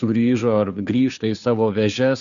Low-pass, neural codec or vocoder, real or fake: 7.2 kHz; codec, 16 kHz, 2 kbps, FunCodec, trained on Chinese and English, 25 frames a second; fake